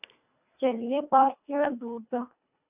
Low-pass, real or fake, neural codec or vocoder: 3.6 kHz; fake; codec, 24 kHz, 1.5 kbps, HILCodec